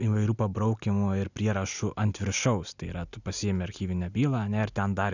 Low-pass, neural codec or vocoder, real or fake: 7.2 kHz; none; real